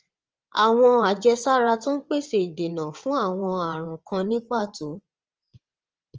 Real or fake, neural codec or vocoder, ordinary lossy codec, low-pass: fake; vocoder, 22.05 kHz, 80 mel bands, WaveNeXt; Opus, 24 kbps; 7.2 kHz